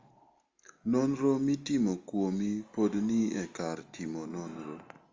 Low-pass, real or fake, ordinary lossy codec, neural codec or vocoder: 7.2 kHz; real; Opus, 32 kbps; none